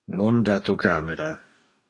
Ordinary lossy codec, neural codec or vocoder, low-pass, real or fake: AAC, 48 kbps; codec, 44.1 kHz, 2.6 kbps, DAC; 10.8 kHz; fake